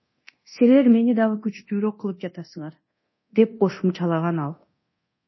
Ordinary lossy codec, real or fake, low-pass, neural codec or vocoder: MP3, 24 kbps; fake; 7.2 kHz; codec, 24 kHz, 0.9 kbps, DualCodec